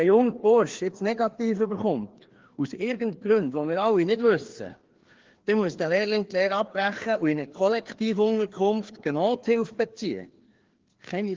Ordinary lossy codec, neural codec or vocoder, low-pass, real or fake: Opus, 16 kbps; codec, 16 kHz, 2 kbps, FreqCodec, larger model; 7.2 kHz; fake